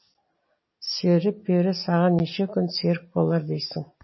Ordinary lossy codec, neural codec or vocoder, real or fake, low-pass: MP3, 24 kbps; none; real; 7.2 kHz